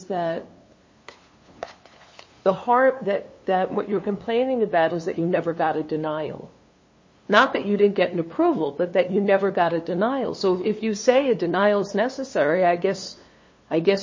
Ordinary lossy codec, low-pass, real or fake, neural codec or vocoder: MP3, 32 kbps; 7.2 kHz; fake; codec, 16 kHz, 2 kbps, FunCodec, trained on LibriTTS, 25 frames a second